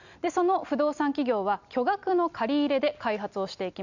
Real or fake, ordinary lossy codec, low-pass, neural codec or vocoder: real; none; 7.2 kHz; none